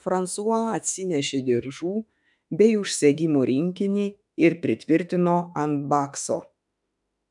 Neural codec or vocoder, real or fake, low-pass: autoencoder, 48 kHz, 32 numbers a frame, DAC-VAE, trained on Japanese speech; fake; 10.8 kHz